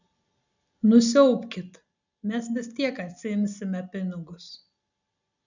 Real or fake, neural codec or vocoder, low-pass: real; none; 7.2 kHz